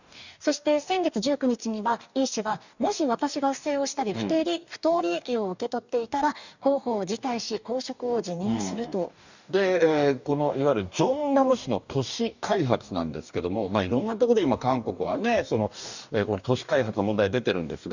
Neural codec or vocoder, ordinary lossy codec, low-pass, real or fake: codec, 44.1 kHz, 2.6 kbps, DAC; none; 7.2 kHz; fake